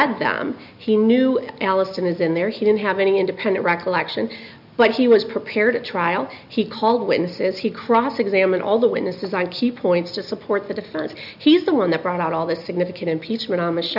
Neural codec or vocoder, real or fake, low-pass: none; real; 5.4 kHz